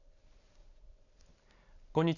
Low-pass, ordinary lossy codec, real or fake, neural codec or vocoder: 7.2 kHz; none; real; none